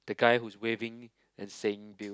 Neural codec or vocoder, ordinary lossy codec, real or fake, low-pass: none; none; real; none